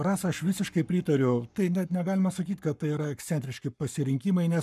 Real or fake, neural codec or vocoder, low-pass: fake; codec, 44.1 kHz, 7.8 kbps, Pupu-Codec; 14.4 kHz